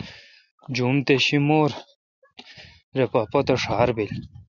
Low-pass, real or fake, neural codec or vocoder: 7.2 kHz; real; none